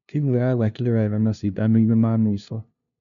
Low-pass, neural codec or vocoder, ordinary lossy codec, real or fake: 7.2 kHz; codec, 16 kHz, 0.5 kbps, FunCodec, trained on LibriTTS, 25 frames a second; none; fake